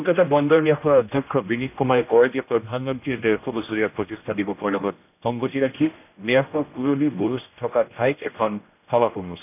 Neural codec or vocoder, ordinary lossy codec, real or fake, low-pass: codec, 16 kHz, 1 kbps, X-Codec, HuBERT features, trained on general audio; none; fake; 3.6 kHz